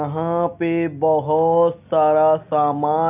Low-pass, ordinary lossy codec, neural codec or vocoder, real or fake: 3.6 kHz; none; none; real